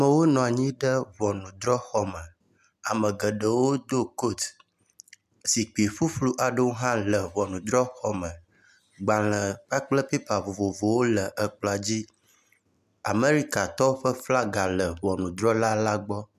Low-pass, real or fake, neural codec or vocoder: 14.4 kHz; real; none